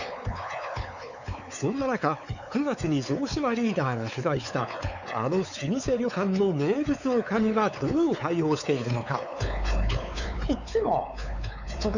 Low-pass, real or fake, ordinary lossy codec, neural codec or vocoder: 7.2 kHz; fake; none; codec, 16 kHz, 4 kbps, X-Codec, WavLM features, trained on Multilingual LibriSpeech